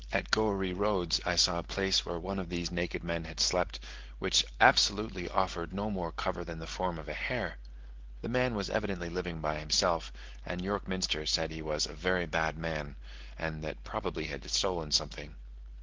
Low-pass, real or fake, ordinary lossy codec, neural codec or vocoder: 7.2 kHz; real; Opus, 16 kbps; none